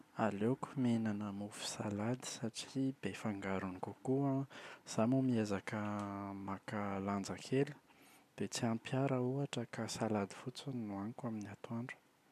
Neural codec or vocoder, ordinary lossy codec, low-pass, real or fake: none; MP3, 96 kbps; 14.4 kHz; real